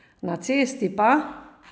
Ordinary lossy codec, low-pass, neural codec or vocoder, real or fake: none; none; none; real